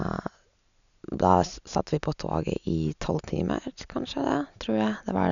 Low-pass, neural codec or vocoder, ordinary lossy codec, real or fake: 7.2 kHz; none; none; real